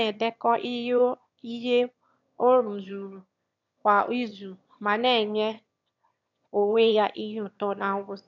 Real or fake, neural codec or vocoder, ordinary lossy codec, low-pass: fake; autoencoder, 22.05 kHz, a latent of 192 numbers a frame, VITS, trained on one speaker; none; 7.2 kHz